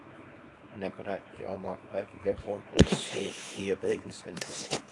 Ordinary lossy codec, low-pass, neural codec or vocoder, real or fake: AAC, 48 kbps; 10.8 kHz; codec, 24 kHz, 0.9 kbps, WavTokenizer, small release; fake